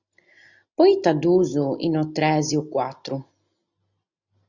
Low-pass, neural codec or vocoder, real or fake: 7.2 kHz; none; real